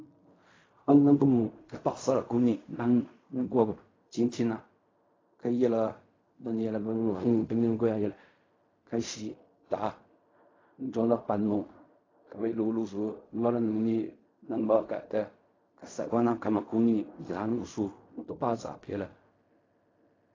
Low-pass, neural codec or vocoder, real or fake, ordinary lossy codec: 7.2 kHz; codec, 16 kHz in and 24 kHz out, 0.4 kbps, LongCat-Audio-Codec, fine tuned four codebook decoder; fake; AAC, 32 kbps